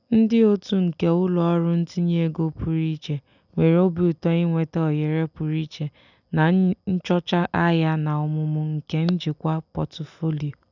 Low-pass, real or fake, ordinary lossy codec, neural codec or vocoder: 7.2 kHz; real; none; none